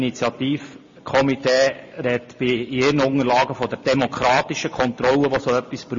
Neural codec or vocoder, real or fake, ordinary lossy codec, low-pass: none; real; MP3, 32 kbps; 7.2 kHz